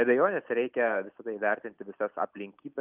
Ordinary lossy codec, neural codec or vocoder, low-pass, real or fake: Opus, 24 kbps; none; 3.6 kHz; real